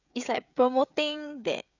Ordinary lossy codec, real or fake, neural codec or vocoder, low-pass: none; fake; codec, 16 kHz, 16 kbps, FreqCodec, smaller model; 7.2 kHz